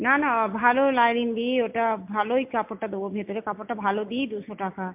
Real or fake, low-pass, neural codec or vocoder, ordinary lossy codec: real; 3.6 kHz; none; MP3, 32 kbps